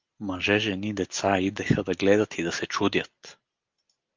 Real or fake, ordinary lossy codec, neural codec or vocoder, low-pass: real; Opus, 32 kbps; none; 7.2 kHz